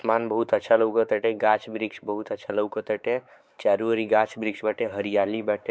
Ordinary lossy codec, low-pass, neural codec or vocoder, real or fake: none; none; codec, 16 kHz, 4 kbps, X-Codec, WavLM features, trained on Multilingual LibriSpeech; fake